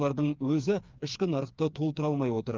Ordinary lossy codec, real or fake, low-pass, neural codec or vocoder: Opus, 32 kbps; fake; 7.2 kHz; codec, 16 kHz, 4 kbps, FreqCodec, smaller model